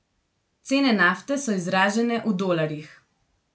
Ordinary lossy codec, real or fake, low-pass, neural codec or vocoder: none; real; none; none